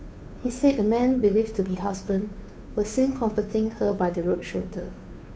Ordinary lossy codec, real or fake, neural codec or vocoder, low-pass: none; fake; codec, 16 kHz, 2 kbps, FunCodec, trained on Chinese and English, 25 frames a second; none